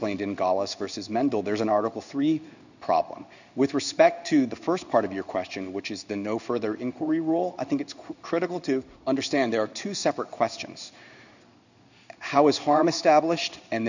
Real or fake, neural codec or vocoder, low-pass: fake; codec, 16 kHz in and 24 kHz out, 1 kbps, XY-Tokenizer; 7.2 kHz